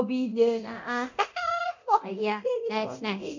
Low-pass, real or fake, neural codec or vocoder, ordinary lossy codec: 7.2 kHz; fake; codec, 24 kHz, 0.9 kbps, DualCodec; MP3, 64 kbps